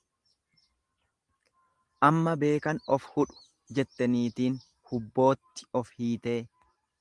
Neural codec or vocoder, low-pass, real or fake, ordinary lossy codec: none; 10.8 kHz; real; Opus, 24 kbps